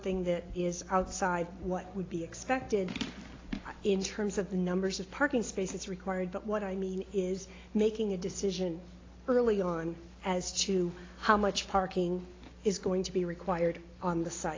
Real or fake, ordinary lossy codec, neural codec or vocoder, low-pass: real; AAC, 32 kbps; none; 7.2 kHz